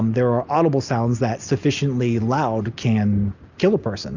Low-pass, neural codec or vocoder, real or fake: 7.2 kHz; none; real